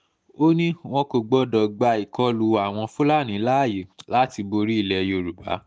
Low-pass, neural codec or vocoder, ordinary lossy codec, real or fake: none; none; none; real